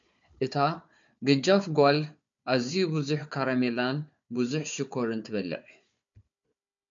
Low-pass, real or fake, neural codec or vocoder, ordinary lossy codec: 7.2 kHz; fake; codec, 16 kHz, 4 kbps, FunCodec, trained on Chinese and English, 50 frames a second; MP3, 64 kbps